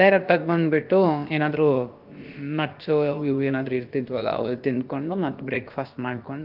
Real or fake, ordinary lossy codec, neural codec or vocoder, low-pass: fake; Opus, 32 kbps; codec, 16 kHz, about 1 kbps, DyCAST, with the encoder's durations; 5.4 kHz